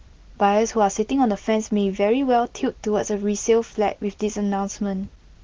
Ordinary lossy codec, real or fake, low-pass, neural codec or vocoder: Opus, 16 kbps; real; 7.2 kHz; none